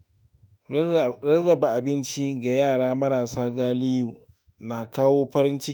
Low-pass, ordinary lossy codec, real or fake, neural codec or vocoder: none; none; fake; autoencoder, 48 kHz, 32 numbers a frame, DAC-VAE, trained on Japanese speech